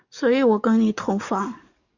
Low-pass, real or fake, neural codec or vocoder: 7.2 kHz; fake; codec, 44.1 kHz, 7.8 kbps, DAC